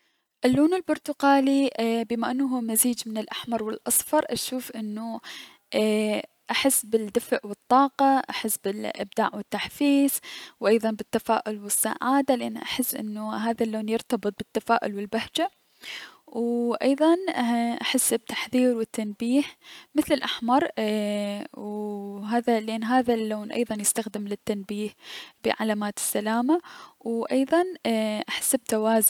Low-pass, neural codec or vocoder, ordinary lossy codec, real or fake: 19.8 kHz; none; none; real